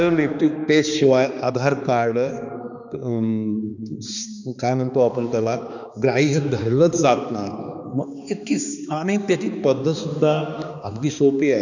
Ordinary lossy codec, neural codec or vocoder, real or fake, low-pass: none; codec, 16 kHz, 2 kbps, X-Codec, HuBERT features, trained on balanced general audio; fake; 7.2 kHz